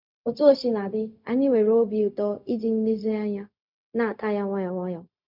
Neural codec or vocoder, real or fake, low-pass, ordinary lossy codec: codec, 16 kHz, 0.4 kbps, LongCat-Audio-Codec; fake; 5.4 kHz; none